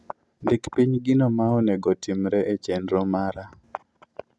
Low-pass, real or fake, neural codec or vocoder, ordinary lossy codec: none; real; none; none